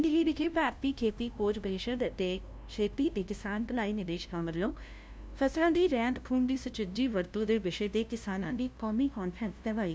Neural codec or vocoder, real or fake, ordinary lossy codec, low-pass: codec, 16 kHz, 0.5 kbps, FunCodec, trained on LibriTTS, 25 frames a second; fake; none; none